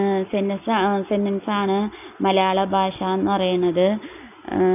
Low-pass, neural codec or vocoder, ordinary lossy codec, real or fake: 3.6 kHz; none; none; real